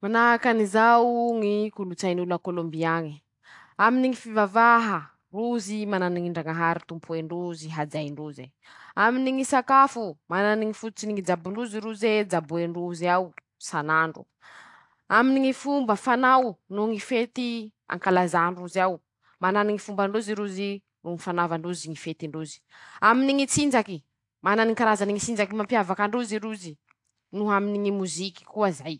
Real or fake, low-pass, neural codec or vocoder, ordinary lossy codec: real; 10.8 kHz; none; AAC, 64 kbps